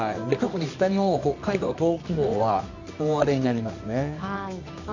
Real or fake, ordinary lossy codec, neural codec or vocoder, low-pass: fake; none; codec, 24 kHz, 0.9 kbps, WavTokenizer, medium music audio release; 7.2 kHz